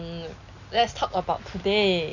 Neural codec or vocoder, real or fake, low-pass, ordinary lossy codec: none; real; 7.2 kHz; none